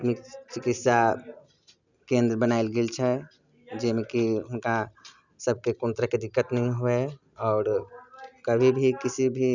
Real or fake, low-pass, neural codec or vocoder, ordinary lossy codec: real; 7.2 kHz; none; none